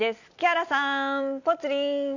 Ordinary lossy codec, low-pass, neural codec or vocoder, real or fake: Opus, 64 kbps; 7.2 kHz; none; real